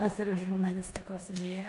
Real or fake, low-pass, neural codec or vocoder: fake; 10.8 kHz; codec, 16 kHz in and 24 kHz out, 0.9 kbps, LongCat-Audio-Codec, four codebook decoder